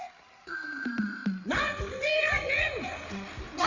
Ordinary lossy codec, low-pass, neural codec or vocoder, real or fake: Opus, 64 kbps; 7.2 kHz; codec, 16 kHz, 8 kbps, FreqCodec, larger model; fake